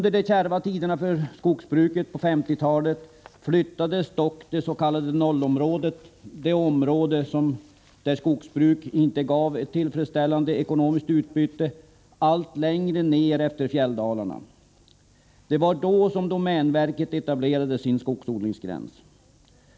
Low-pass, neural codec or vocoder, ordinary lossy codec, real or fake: none; none; none; real